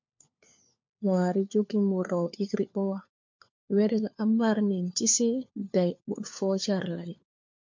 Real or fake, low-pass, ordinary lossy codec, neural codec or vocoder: fake; 7.2 kHz; MP3, 48 kbps; codec, 16 kHz, 4 kbps, FunCodec, trained on LibriTTS, 50 frames a second